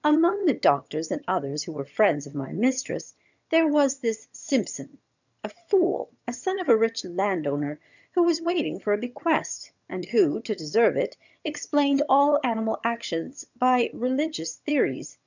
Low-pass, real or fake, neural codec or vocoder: 7.2 kHz; fake; vocoder, 22.05 kHz, 80 mel bands, HiFi-GAN